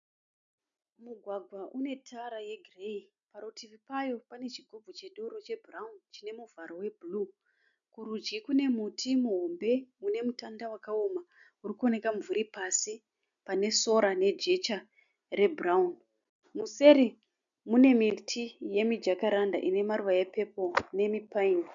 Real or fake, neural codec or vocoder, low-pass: real; none; 7.2 kHz